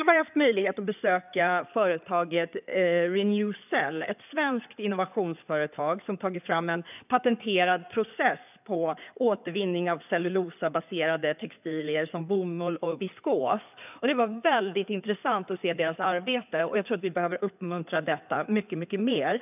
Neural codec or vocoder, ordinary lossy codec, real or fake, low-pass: codec, 16 kHz in and 24 kHz out, 2.2 kbps, FireRedTTS-2 codec; none; fake; 3.6 kHz